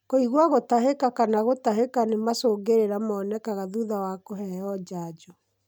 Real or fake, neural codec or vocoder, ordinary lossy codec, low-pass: real; none; none; none